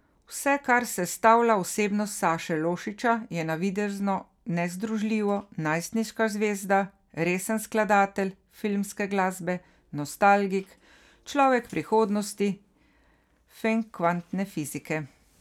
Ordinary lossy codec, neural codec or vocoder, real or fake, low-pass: none; none; real; 19.8 kHz